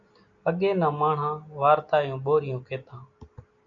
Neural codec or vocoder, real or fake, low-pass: none; real; 7.2 kHz